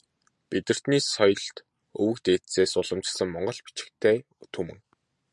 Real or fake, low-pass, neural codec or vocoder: real; 10.8 kHz; none